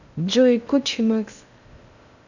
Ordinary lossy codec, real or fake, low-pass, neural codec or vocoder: none; fake; 7.2 kHz; codec, 16 kHz in and 24 kHz out, 0.6 kbps, FocalCodec, streaming, 2048 codes